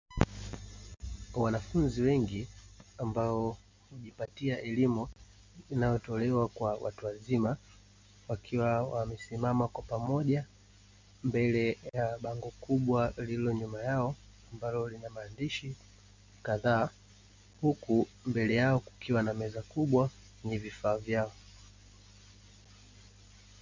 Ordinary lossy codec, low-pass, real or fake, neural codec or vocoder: MP3, 64 kbps; 7.2 kHz; real; none